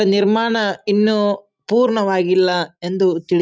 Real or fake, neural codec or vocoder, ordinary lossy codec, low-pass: real; none; none; none